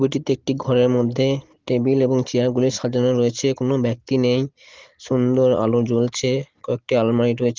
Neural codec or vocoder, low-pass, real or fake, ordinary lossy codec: none; 7.2 kHz; real; Opus, 16 kbps